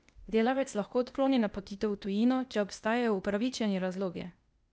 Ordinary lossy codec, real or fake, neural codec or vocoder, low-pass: none; fake; codec, 16 kHz, 0.8 kbps, ZipCodec; none